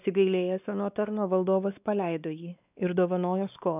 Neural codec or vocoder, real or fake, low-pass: codec, 16 kHz, 2 kbps, X-Codec, WavLM features, trained on Multilingual LibriSpeech; fake; 3.6 kHz